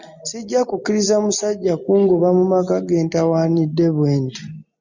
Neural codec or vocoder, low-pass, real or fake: none; 7.2 kHz; real